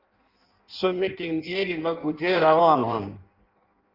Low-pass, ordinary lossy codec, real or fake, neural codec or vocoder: 5.4 kHz; Opus, 32 kbps; fake; codec, 16 kHz in and 24 kHz out, 0.6 kbps, FireRedTTS-2 codec